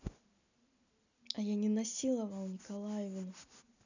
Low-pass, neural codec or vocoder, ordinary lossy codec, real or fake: 7.2 kHz; none; none; real